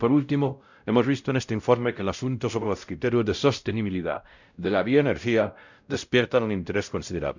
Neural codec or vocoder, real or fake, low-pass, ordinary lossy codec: codec, 16 kHz, 0.5 kbps, X-Codec, WavLM features, trained on Multilingual LibriSpeech; fake; 7.2 kHz; none